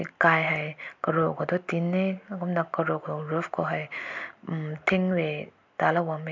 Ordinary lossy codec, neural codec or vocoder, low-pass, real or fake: MP3, 64 kbps; none; 7.2 kHz; real